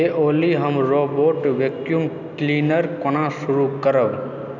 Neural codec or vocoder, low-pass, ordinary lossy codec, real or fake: none; 7.2 kHz; none; real